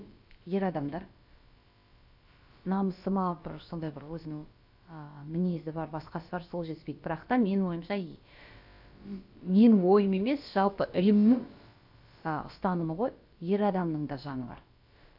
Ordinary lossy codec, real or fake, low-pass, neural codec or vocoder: AAC, 48 kbps; fake; 5.4 kHz; codec, 16 kHz, about 1 kbps, DyCAST, with the encoder's durations